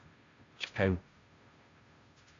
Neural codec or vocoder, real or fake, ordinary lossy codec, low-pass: codec, 16 kHz, 0.5 kbps, FunCodec, trained on Chinese and English, 25 frames a second; fake; AAC, 48 kbps; 7.2 kHz